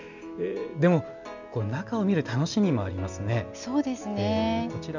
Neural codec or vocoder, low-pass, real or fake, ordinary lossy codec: none; 7.2 kHz; real; none